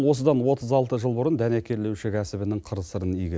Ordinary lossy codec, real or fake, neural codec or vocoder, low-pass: none; real; none; none